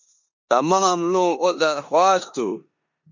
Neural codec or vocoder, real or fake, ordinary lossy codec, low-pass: codec, 16 kHz in and 24 kHz out, 0.9 kbps, LongCat-Audio-Codec, four codebook decoder; fake; MP3, 48 kbps; 7.2 kHz